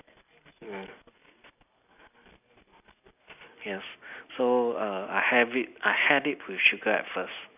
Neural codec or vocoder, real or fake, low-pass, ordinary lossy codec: none; real; 3.6 kHz; none